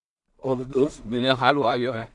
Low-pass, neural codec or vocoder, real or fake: 10.8 kHz; codec, 16 kHz in and 24 kHz out, 0.4 kbps, LongCat-Audio-Codec, two codebook decoder; fake